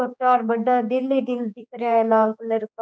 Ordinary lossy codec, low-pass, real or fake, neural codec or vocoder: none; none; fake; codec, 16 kHz, 4 kbps, X-Codec, HuBERT features, trained on general audio